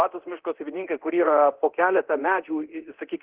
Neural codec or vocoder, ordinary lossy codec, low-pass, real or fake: codec, 24 kHz, 0.9 kbps, DualCodec; Opus, 16 kbps; 3.6 kHz; fake